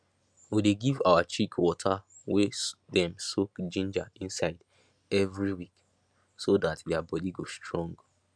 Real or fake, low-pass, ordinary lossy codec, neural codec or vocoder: real; none; none; none